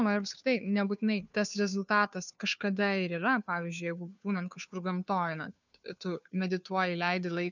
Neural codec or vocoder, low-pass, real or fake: codec, 16 kHz, 2 kbps, FunCodec, trained on Chinese and English, 25 frames a second; 7.2 kHz; fake